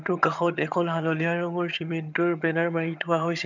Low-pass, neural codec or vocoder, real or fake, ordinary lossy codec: 7.2 kHz; vocoder, 22.05 kHz, 80 mel bands, HiFi-GAN; fake; none